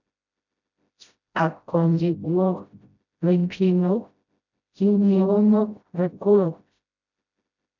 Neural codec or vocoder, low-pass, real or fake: codec, 16 kHz, 0.5 kbps, FreqCodec, smaller model; 7.2 kHz; fake